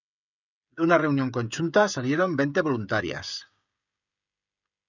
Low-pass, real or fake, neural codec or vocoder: 7.2 kHz; fake; codec, 16 kHz, 16 kbps, FreqCodec, smaller model